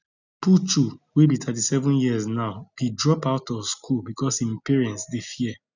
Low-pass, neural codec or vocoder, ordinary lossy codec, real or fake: 7.2 kHz; none; none; real